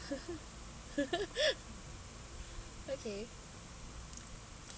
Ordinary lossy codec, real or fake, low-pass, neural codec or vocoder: none; real; none; none